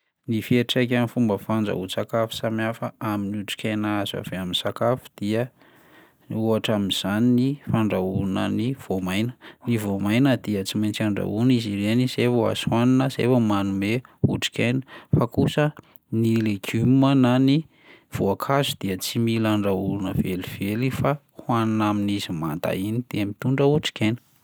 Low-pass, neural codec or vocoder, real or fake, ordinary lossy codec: none; none; real; none